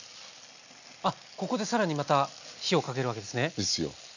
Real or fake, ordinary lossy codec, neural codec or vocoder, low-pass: real; none; none; 7.2 kHz